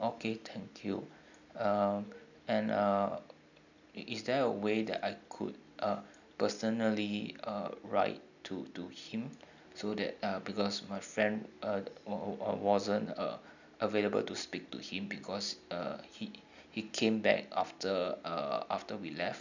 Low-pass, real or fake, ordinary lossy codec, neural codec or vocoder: 7.2 kHz; real; none; none